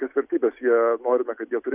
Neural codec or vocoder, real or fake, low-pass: none; real; 3.6 kHz